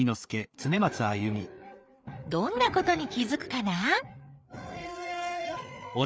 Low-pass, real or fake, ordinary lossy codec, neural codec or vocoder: none; fake; none; codec, 16 kHz, 4 kbps, FreqCodec, larger model